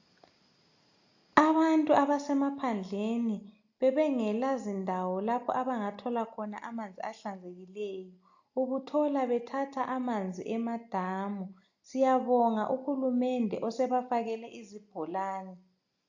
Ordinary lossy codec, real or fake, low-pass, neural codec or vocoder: AAC, 48 kbps; real; 7.2 kHz; none